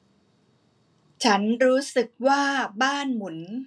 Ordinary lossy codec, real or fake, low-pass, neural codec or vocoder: none; real; none; none